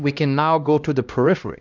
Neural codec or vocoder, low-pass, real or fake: codec, 16 kHz, 1 kbps, X-Codec, HuBERT features, trained on LibriSpeech; 7.2 kHz; fake